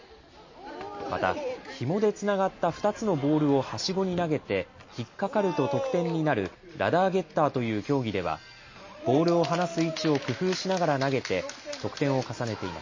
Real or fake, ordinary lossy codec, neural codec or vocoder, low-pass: real; MP3, 32 kbps; none; 7.2 kHz